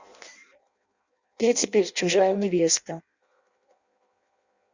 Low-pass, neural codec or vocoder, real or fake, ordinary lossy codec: 7.2 kHz; codec, 16 kHz in and 24 kHz out, 0.6 kbps, FireRedTTS-2 codec; fake; Opus, 64 kbps